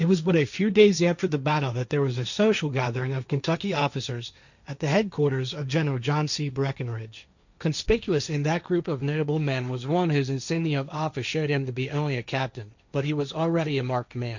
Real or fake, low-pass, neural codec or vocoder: fake; 7.2 kHz; codec, 16 kHz, 1.1 kbps, Voila-Tokenizer